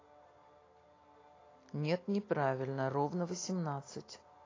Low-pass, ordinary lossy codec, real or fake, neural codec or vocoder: 7.2 kHz; AAC, 32 kbps; real; none